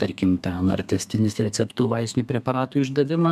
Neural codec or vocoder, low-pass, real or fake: codec, 32 kHz, 1.9 kbps, SNAC; 14.4 kHz; fake